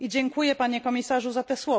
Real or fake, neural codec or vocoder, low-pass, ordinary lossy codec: real; none; none; none